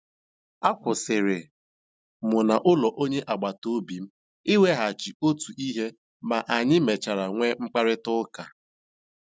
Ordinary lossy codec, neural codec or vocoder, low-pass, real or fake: none; none; none; real